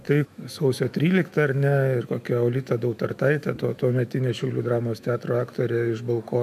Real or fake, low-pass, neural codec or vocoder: fake; 14.4 kHz; autoencoder, 48 kHz, 128 numbers a frame, DAC-VAE, trained on Japanese speech